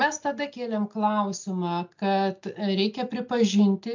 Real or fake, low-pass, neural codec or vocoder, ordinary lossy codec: real; 7.2 kHz; none; MP3, 64 kbps